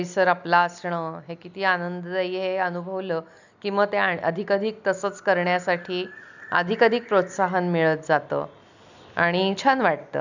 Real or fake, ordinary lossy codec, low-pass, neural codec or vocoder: real; none; 7.2 kHz; none